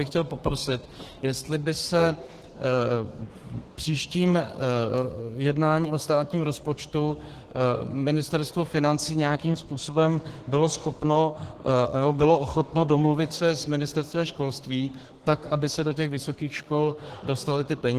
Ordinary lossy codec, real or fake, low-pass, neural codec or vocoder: Opus, 16 kbps; fake; 14.4 kHz; codec, 32 kHz, 1.9 kbps, SNAC